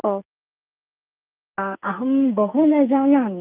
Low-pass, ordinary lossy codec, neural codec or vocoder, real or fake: 3.6 kHz; Opus, 32 kbps; codec, 44.1 kHz, 2.6 kbps, SNAC; fake